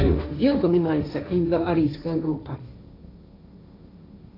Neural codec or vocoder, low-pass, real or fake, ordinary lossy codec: codec, 16 kHz, 1.1 kbps, Voila-Tokenizer; 5.4 kHz; fake; AAC, 32 kbps